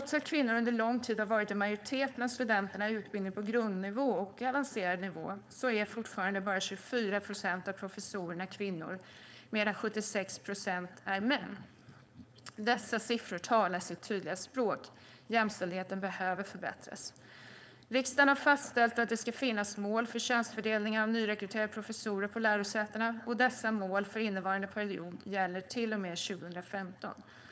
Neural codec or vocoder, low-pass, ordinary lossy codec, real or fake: codec, 16 kHz, 4.8 kbps, FACodec; none; none; fake